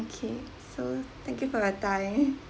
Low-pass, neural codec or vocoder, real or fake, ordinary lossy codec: none; none; real; none